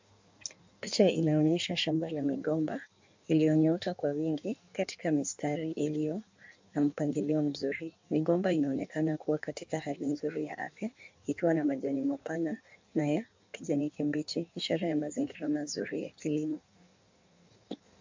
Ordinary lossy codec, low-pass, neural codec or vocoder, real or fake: MP3, 64 kbps; 7.2 kHz; codec, 16 kHz in and 24 kHz out, 1.1 kbps, FireRedTTS-2 codec; fake